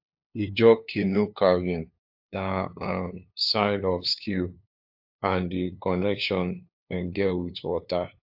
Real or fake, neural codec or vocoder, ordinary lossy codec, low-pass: fake; codec, 16 kHz, 2 kbps, FunCodec, trained on LibriTTS, 25 frames a second; AAC, 48 kbps; 5.4 kHz